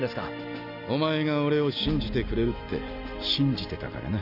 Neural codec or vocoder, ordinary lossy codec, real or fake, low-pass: none; none; real; 5.4 kHz